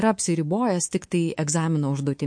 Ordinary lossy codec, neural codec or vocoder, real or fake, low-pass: MP3, 48 kbps; codec, 24 kHz, 3.1 kbps, DualCodec; fake; 9.9 kHz